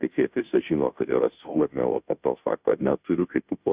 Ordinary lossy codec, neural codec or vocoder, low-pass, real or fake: Opus, 64 kbps; codec, 16 kHz, 0.5 kbps, FunCodec, trained on Chinese and English, 25 frames a second; 3.6 kHz; fake